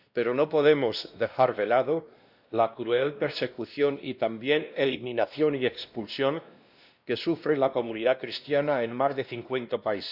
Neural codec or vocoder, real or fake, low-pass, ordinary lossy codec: codec, 16 kHz, 1 kbps, X-Codec, WavLM features, trained on Multilingual LibriSpeech; fake; 5.4 kHz; Opus, 64 kbps